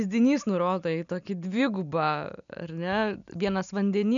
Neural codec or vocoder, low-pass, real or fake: none; 7.2 kHz; real